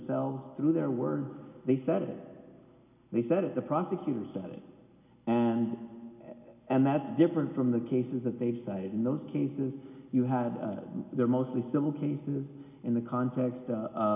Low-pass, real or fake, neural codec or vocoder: 3.6 kHz; real; none